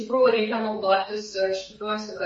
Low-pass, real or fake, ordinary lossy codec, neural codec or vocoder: 10.8 kHz; fake; MP3, 32 kbps; codec, 32 kHz, 1.9 kbps, SNAC